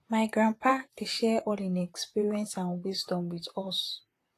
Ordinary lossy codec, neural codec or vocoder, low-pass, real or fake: AAC, 48 kbps; vocoder, 44.1 kHz, 128 mel bands, Pupu-Vocoder; 14.4 kHz; fake